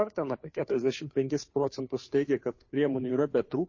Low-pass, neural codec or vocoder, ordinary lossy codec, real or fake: 7.2 kHz; codec, 16 kHz, 2 kbps, FunCodec, trained on Chinese and English, 25 frames a second; MP3, 32 kbps; fake